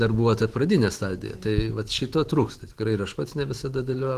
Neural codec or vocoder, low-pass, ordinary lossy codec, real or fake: autoencoder, 48 kHz, 128 numbers a frame, DAC-VAE, trained on Japanese speech; 14.4 kHz; Opus, 16 kbps; fake